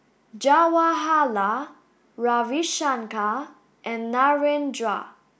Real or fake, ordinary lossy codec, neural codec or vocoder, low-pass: real; none; none; none